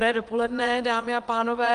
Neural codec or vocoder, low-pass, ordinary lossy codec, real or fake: vocoder, 22.05 kHz, 80 mel bands, Vocos; 9.9 kHz; Opus, 24 kbps; fake